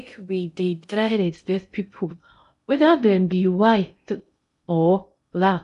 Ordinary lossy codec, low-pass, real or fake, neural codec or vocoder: none; 10.8 kHz; fake; codec, 16 kHz in and 24 kHz out, 0.6 kbps, FocalCodec, streaming, 2048 codes